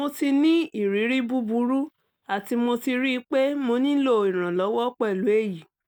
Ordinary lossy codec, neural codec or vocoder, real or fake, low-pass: none; none; real; none